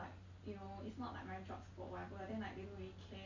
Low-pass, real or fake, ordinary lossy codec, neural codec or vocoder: 7.2 kHz; real; MP3, 48 kbps; none